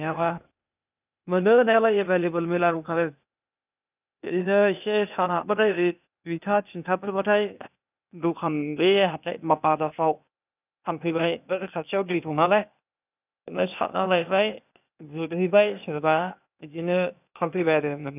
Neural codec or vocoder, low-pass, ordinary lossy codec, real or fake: codec, 16 kHz, 0.8 kbps, ZipCodec; 3.6 kHz; none; fake